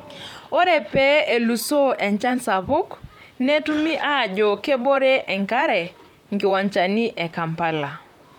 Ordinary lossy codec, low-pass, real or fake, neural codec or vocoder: MP3, 96 kbps; 19.8 kHz; fake; vocoder, 44.1 kHz, 128 mel bands, Pupu-Vocoder